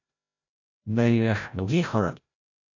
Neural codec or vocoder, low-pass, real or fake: codec, 16 kHz, 0.5 kbps, FreqCodec, larger model; 7.2 kHz; fake